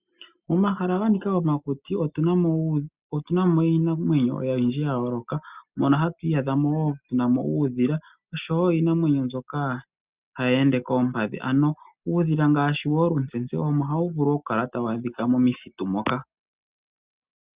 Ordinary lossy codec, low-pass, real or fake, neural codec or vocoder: Opus, 64 kbps; 3.6 kHz; real; none